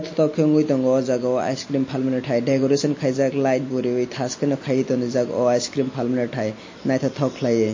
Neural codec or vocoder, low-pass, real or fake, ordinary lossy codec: none; 7.2 kHz; real; MP3, 32 kbps